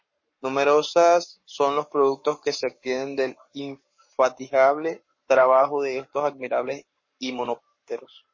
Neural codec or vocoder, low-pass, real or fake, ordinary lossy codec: codec, 44.1 kHz, 7.8 kbps, Pupu-Codec; 7.2 kHz; fake; MP3, 32 kbps